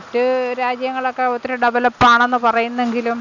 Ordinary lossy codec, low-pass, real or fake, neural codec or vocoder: none; 7.2 kHz; real; none